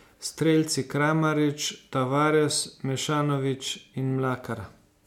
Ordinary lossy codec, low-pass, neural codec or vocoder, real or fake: MP3, 96 kbps; 19.8 kHz; none; real